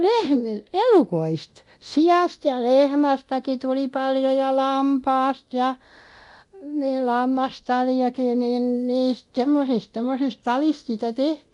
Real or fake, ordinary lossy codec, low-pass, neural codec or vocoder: fake; none; 10.8 kHz; codec, 24 kHz, 0.9 kbps, DualCodec